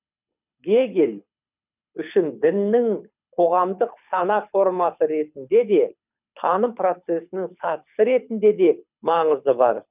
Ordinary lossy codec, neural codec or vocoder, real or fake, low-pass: none; codec, 24 kHz, 6 kbps, HILCodec; fake; 3.6 kHz